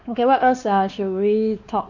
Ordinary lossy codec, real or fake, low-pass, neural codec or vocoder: none; fake; 7.2 kHz; codec, 16 kHz, 2 kbps, X-Codec, WavLM features, trained on Multilingual LibriSpeech